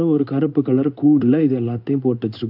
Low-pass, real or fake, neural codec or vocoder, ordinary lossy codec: 5.4 kHz; fake; codec, 16 kHz in and 24 kHz out, 1 kbps, XY-Tokenizer; none